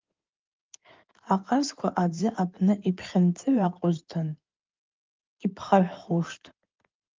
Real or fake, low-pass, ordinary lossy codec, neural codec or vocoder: fake; 7.2 kHz; Opus, 24 kbps; codec, 16 kHz in and 24 kHz out, 2.2 kbps, FireRedTTS-2 codec